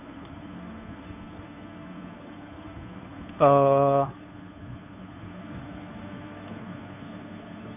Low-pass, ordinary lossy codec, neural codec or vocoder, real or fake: 3.6 kHz; none; codec, 16 kHz, 2 kbps, FunCodec, trained on Chinese and English, 25 frames a second; fake